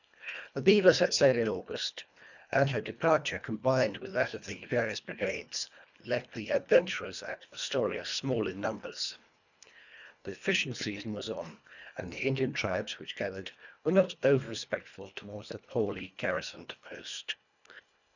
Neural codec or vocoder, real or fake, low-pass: codec, 24 kHz, 1.5 kbps, HILCodec; fake; 7.2 kHz